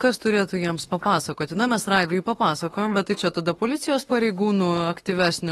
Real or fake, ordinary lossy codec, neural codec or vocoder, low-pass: fake; AAC, 32 kbps; autoencoder, 48 kHz, 32 numbers a frame, DAC-VAE, trained on Japanese speech; 19.8 kHz